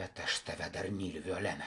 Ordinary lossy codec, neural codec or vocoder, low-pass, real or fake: AAC, 64 kbps; none; 10.8 kHz; real